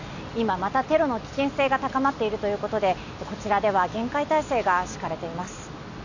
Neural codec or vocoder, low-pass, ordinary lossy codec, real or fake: none; 7.2 kHz; none; real